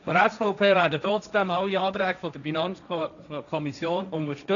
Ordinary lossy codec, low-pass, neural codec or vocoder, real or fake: none; 7.2 kHz; codec, 16 kHz, 1.1 kbps, Voila-Tokenizer; fake